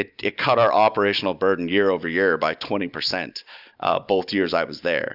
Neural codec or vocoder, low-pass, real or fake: none; 5.4 kHz; real